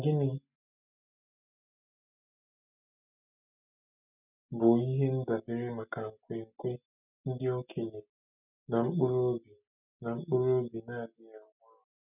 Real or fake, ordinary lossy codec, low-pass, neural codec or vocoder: real; none; 3.6 kHz; none